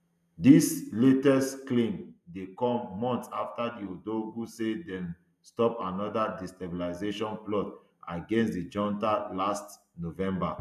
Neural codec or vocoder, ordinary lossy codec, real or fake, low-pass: none; none; real; 14.4 kHz